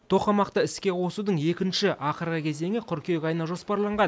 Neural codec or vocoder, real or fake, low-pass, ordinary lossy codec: none; real; none; none